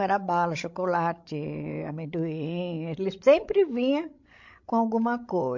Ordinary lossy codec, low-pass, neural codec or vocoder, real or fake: MP3, 48 kbps; 7.2 kHz; codec, 16 kHz, 16 kbps, FreqCodec, larger model; fake